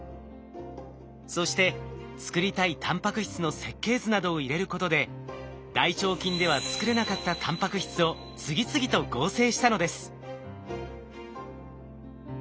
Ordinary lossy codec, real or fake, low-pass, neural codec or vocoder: none; real; none; none